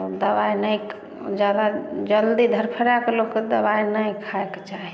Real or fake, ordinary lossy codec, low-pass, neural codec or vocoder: real; none; none; none